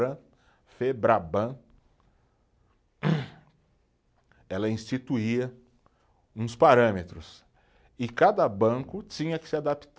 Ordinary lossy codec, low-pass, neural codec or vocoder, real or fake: none; none; none; real